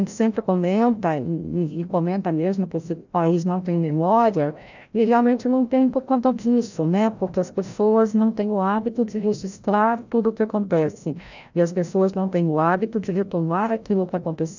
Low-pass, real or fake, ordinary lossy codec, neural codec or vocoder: 7.2 kHz; fake; none; codec, 16 kHz, 0.5 kbps, FreqCodec, larger model